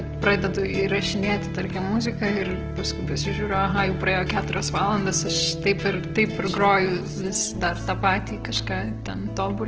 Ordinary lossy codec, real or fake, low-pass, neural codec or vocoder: Opus, 16 kbps; real; 7.2 kHz; none